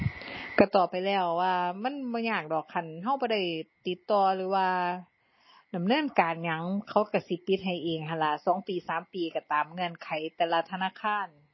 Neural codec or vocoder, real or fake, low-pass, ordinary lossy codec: none; real; 7.2 kHz; MP3, 24 kbps